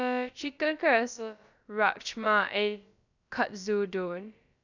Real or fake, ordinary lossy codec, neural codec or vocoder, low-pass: fake; none; codec, 16 kHz, about 1 kbps, DyCAST, with the encoder's durations; 7.2 kHz